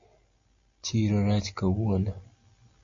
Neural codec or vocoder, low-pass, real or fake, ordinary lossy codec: none; 7.2 kHz; real; MP3, 48 kbps